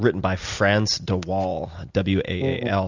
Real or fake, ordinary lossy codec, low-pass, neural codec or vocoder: real; Opus, 64 kbps; 7.2 kHz; none